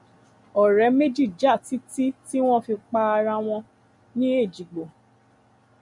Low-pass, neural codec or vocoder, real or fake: 10.8 kHz; none; real